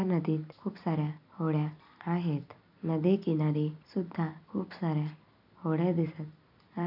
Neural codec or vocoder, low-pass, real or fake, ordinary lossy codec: none; 5.4 kHz; real; none